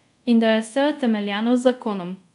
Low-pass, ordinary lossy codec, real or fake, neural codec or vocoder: 10.8 kHz; none; fake; codec, 24 kHz, 0.5 kbps, DualCodec